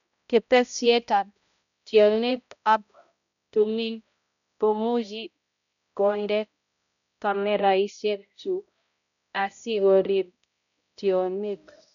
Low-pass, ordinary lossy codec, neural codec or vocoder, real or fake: 7.2 kHz; none; codec, 16 kHz, 0.5 kbps, X-Codec, HuBERT features, trained on balanced general audio; fake